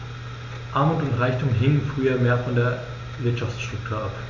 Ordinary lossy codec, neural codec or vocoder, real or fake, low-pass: MP3, 64 kbps; none; real; 7.2 kHz